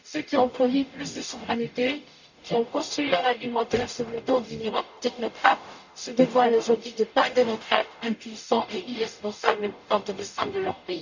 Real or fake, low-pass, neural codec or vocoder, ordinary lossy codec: fake; 7.2 kHz; codec, 44.1 kHz, 0.9 kbps, DAC; none